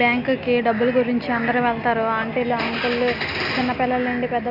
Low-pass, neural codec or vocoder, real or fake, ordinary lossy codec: 5.4 kHz; none; real; none